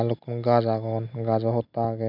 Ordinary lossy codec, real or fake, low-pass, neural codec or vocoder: none; real; 5.4 kHz; none